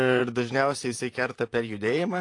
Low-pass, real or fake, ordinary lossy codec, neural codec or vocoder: 10.8 kHz; fake; AAC, 48 kbps; vocoder, 44.1 kHz, 128 mel bands, Pupu-Vocoder